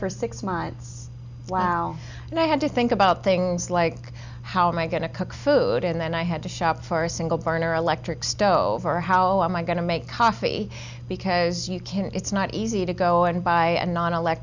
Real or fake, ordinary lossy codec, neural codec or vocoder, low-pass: real; Opus, 64 kbps; none; 7.2 kHz